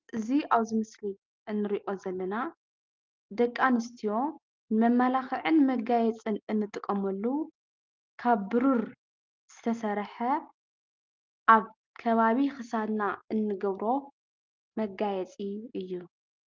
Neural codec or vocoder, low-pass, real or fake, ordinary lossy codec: none; 7.2 kHz; real; Opus, 24 kbps